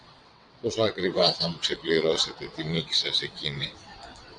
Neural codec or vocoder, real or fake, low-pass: vocoder, 22.05 kHz, 80 mel bands, WaveNeXt; fake; 9.9 kHz